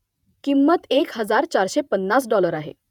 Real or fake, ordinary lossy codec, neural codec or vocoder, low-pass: fake; none; vocoder, 48 kHz, 128 mel bands, Vocos; 19.8 kHz